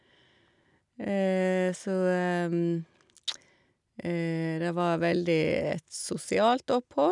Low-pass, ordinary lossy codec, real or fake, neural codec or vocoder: 10.8 kHz; none; real; none